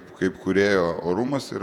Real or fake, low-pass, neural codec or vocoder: fake; 19.8 kHz; vocoder, 48 kHz, 128 mel bands, Vocos